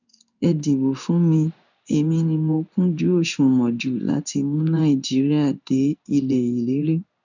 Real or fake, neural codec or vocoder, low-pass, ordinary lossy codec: fake; codec, 16 kHz in and 24 kHz out, 1 kbps, XY-Tokenizer; 7.2 kHz; none